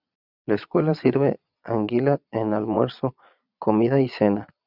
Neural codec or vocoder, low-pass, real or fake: vocoder, 22.05 kHz, 80 mel bands, Vocos; 5.4 kHz; fake